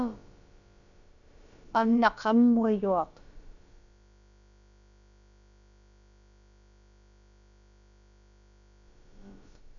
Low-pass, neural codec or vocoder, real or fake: 7.2 kHz; codec, 16 kHz, about 1 kbps, DyCAST, with the encoder's durations; fake